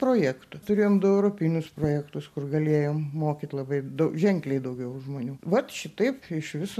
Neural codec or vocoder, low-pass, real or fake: none; 14.4 kHz; real